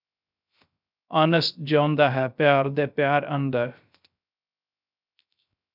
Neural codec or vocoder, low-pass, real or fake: codec, 16 kHz, 0.3 kbps, FocalCodec; 5.4 kHz; fake